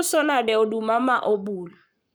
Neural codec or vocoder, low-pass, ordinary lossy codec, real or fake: codec, 44.1 kHz, 7.8 kbps, Pupu-Codec; none; none; fake